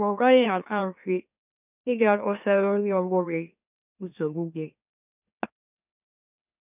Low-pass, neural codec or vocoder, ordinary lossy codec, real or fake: 3.6 kHz; autoencoder, 44.1 kHz, a latent of 192 numbers a frame, MeloTTS; none; fake